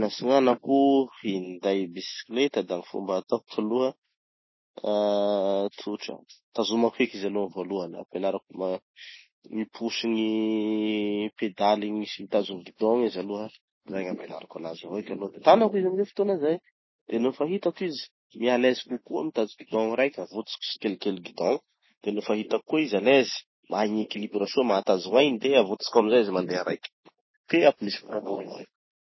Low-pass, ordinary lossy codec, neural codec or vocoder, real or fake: 7.2 kHz; MP3, 24 kbps; none; real